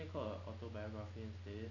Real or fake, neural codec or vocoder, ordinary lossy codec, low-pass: real; none; none; 7.2 kHz